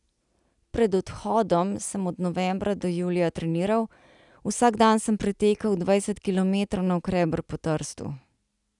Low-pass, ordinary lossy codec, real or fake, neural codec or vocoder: 10.8 kHz; none; real; none